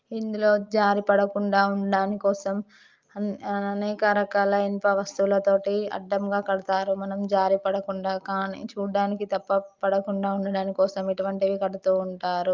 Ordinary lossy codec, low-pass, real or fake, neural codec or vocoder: Opus, 32 kbps; 7.2 kHz; real; none